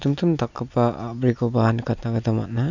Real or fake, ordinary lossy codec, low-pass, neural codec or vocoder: real; none; 7.2 kHz; none